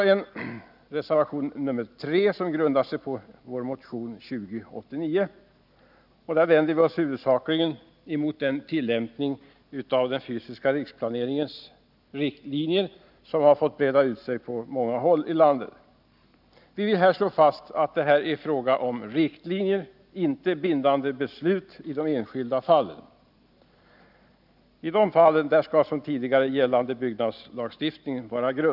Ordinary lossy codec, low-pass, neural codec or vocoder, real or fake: none; 5.4 kHz; none; real